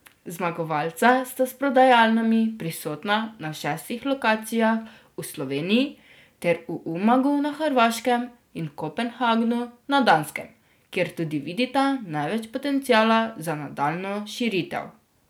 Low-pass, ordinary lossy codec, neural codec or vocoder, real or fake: none; none; none; real